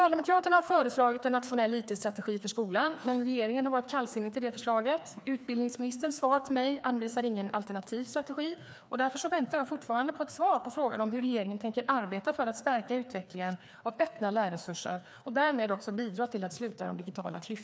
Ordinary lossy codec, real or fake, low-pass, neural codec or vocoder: none; fake; none; codec, 16 kHz, 2 kbps, FreqCodec, larger model